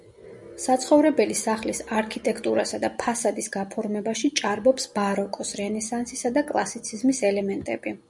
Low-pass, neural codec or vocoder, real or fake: 10.8 kHz; none; real